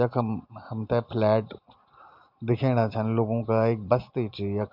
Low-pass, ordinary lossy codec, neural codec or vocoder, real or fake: 5.4 kHz; MP3, 48 kbps; none; real